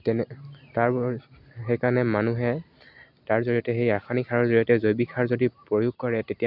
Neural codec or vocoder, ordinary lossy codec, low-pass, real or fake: none; none; 5.4 kHz; real